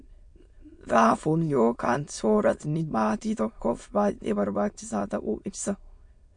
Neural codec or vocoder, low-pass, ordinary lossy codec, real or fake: autoencoder, 22.05 kHz, a latent of 192 numbers a frame, VITS, trained on many speakers; 9.9 kHz; MP3, 48 kbps; fake